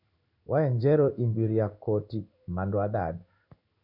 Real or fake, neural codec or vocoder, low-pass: fake; codec, 16 kHz in and 24 kHz out, 1 kbps, XY-Tokenizer; 5.4 kHz